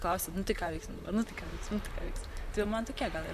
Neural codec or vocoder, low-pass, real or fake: vocoder, 44.1 kHz, 128 mel bands, Pupu-Vocoder; 14.4 kHz; fake